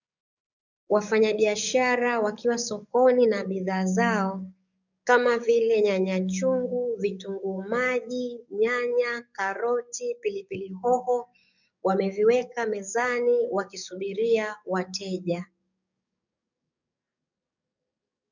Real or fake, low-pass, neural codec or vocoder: fake; 7.2 kHz; codec, 44.1 kHz, 7.8 kbps, DAC